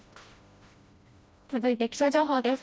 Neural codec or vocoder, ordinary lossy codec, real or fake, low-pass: codec, 16 kHz, 1 kbps, FreqCodec, smaller model; none; fake; none